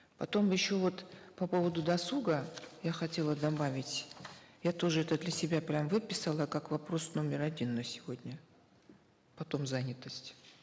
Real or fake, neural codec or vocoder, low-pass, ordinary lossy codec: real; none; none; none